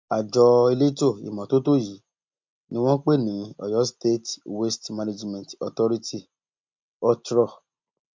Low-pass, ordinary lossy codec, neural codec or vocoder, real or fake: 7.2 kHz; MP3, 64 kbps; none; real